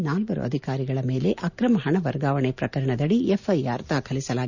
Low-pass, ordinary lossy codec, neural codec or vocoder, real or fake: 7.2 kHz; none; none; real